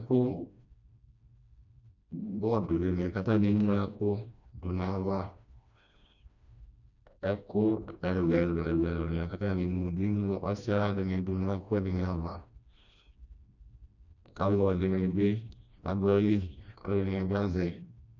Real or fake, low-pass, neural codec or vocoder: fake; 7.2 kHz; codec, 16 kHz, 1 kbps, FreqCodec, smaller model